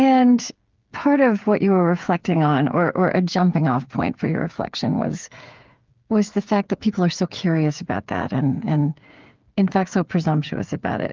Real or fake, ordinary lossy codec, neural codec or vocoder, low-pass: fake; Opus, 32 kbps; codec, 16 kHz, 8 kbps, FreqCodec, smaller model; 7.2 kHz